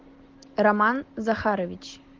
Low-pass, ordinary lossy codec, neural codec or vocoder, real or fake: 7.2 kHz; Opus, 16 kbps; none; real